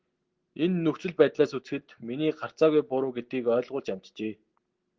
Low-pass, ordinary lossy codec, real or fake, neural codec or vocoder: 7.2 kHz; Opus, 16 kbps; real; none